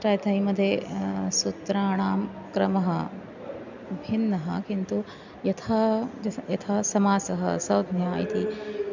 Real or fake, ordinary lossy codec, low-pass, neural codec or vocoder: real; none; 7.2 kHz; none